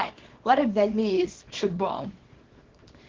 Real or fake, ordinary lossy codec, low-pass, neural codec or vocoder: fake; Opus, 16 kbps; 7.2 kHz; codec, 24 kHz, 0.9 kbps, WavTokenizer, small release